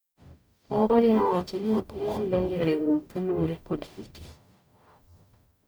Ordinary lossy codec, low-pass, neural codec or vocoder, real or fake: none; none; codec, 44.1 kHz, 0.9 kbps, DAC; fake